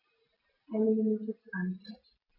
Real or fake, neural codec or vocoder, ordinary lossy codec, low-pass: real; none; none; 5.4 kHz